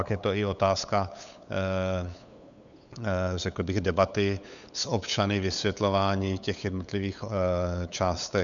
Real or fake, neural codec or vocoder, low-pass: fake; codec, 16 kHz, 8 kbps, FunCodec, trained on LibriTTS, 25 frames a second; 7.2 kHz